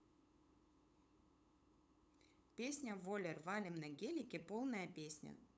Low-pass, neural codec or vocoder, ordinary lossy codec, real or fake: none; codec, 16 kHz, 8 kbps, FunCodec, trained on LibriTTS, 25 frames a second; none; fake